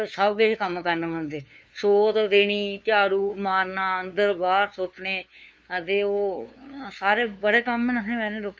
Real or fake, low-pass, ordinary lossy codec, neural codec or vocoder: fake; none; none; codec, 16 kHz, 2 kbps, FunCodec, trained on LibriTTS, 25 frames a second